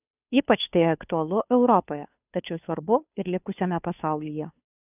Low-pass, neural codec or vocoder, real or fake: 3.6 kHz; codec, 16 kHz, 2 kbps, FunCodec, trained on Chinese and English, 25 frames a second; fake